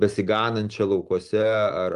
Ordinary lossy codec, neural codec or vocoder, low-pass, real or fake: Opus, 32 kbps; none; 10.8 kHz; real